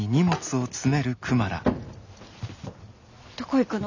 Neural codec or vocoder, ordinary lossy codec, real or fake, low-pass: none; none; real; 7.2 kHz